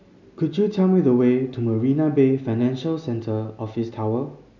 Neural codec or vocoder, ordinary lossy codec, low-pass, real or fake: none; none; 7.2 kHz; real